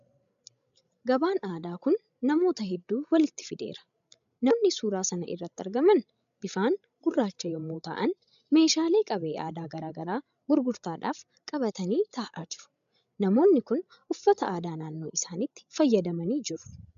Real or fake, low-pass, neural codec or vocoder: fake; 7.2 kHz; codec, 16 kHz, 16 kbps, FreqCodec, larger model